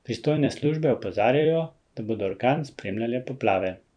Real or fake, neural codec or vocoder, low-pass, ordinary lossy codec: fake; vocoder, 44.1 kHz, 128 mel bands every 256 samples, BigVGAN v2; 9.9 kHz; none